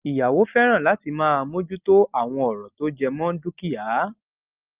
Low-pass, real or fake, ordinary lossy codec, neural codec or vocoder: 3.6 kHz; real; Opus, 32 kbps; none